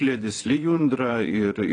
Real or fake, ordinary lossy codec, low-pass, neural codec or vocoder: fake; AAC, 32 kbps; 9.9 kHz; vocoder, 22.05 kHz, 80 mel bands, WaveNeXt